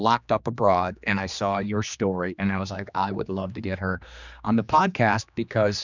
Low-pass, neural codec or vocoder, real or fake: 7.2 kHz; codec, 16 kHz, 2 kbps, X-Codec, HuBERT features, trained on general audio; fake